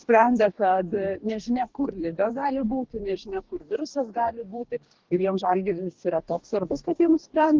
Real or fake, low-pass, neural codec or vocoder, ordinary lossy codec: fake; 7.2 kHz; codec, 44.1 kHz, 2.6 kbps, DAC; Opus, 16 kbps